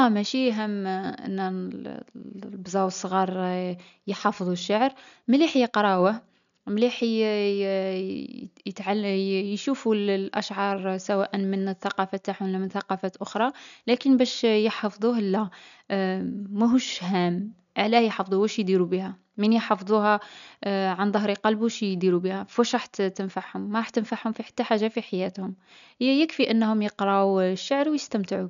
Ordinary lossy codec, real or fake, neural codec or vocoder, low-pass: none; real; none; 7.2 kHz